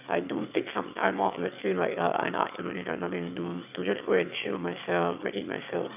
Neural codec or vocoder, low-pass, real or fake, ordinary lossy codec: autoencoder, 22.05 kHz, a latent of 192 numbers a frame, VITS, trained on one speaker; 3.6 kHz; fake; none